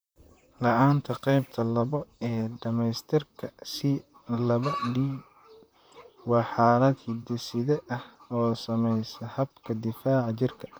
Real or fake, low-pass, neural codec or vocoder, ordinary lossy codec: fake; none; vocoder, 44.1 kHz, 128 mel bands, Pupu-Vocoder; none